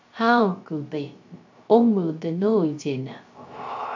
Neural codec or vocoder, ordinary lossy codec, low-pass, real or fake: codec, 16 kHz, 0.3 kbps, FocalCodec; MP3, 48 kbps; 7.2 kHz; fake